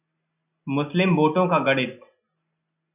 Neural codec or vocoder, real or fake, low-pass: none; real; 3.6 kHz